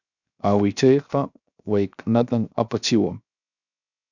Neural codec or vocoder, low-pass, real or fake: codec, 16 kHz, 0.7 kbps, FocalCodec; 7.2 kHz; fake